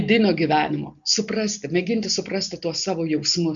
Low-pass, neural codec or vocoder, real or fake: 7.2 kHz; none; real